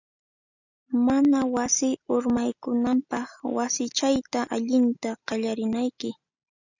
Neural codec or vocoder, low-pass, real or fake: none; 7.2 kHz; real